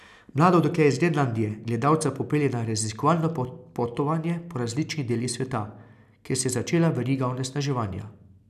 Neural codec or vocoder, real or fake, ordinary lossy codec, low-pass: none; real; none; 14.4 kHz